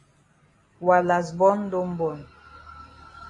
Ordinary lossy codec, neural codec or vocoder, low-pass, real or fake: AAC, 32 kbps; none; 10.8 kHz; real